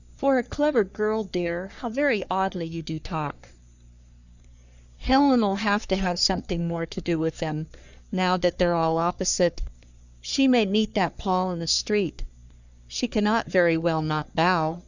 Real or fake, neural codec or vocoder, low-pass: fake; codec, 44.1 kHz, 3.4 kbps, Pupu-Codec; 7.2 kHz